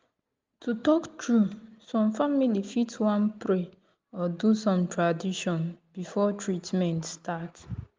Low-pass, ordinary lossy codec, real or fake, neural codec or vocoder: 7.2 kHz; Opus, 32 kbps; real; none